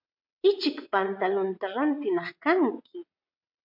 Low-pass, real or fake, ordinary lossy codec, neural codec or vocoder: 5.4 kHz; fake; MP3, 48 kbps; vocoder, 22.05 kHz, 80 mel bands, WaveNeXt